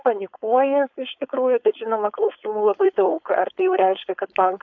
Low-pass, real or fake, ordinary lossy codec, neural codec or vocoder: 7.2 kHz; fake; AAC, 48 kbps; codec, 16 kHz, 4.8 kbps, FACodec